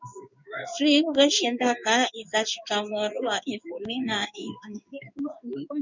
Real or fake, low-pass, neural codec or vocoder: fake; 7.2 kHz; codec, 16 kHz in and 24 kHz out, 1 kbps, XY-Tokenizer